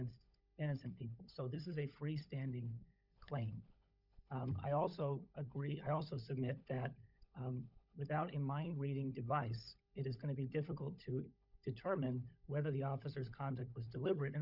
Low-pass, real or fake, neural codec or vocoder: 5.4 kHz; fake; codec, 16 kHz, 16 kbps, FunCodec, trained on LibriTTS, 50 frames a second